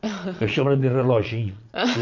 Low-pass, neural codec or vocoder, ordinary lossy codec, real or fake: 7.2 kHz; vocoder, 22.05 kHz, 80 mel bands, Vocos; MP3, 64 kbps; fake